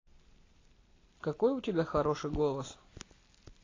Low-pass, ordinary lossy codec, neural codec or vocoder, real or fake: 7.2 kHz; AAC, 32 kbps; none; real